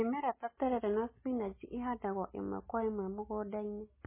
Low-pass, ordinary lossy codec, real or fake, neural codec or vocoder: 3.6 kHz; MP3, 16 kbps; real; none